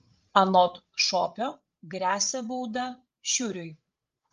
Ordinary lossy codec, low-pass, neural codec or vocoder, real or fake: Opus, 32 kbps; 7.2 kHz; codec, 16 kHz, 8 kbps, FreqCodec, larger model; fake